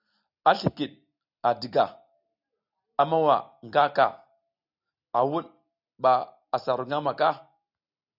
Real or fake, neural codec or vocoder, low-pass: real; none; 5.4 kHz